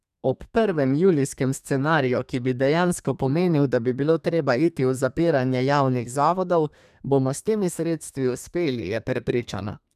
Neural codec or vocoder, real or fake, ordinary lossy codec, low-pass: codec, 32 kHz, 1.9 kbps, SNAC; fake; none; 14.4 kHz